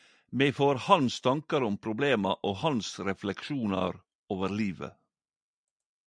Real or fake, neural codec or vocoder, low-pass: real; none; 9.9 kHz